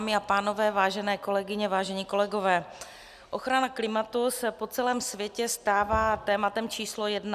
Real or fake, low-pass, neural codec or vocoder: real; 14.4 kHz; none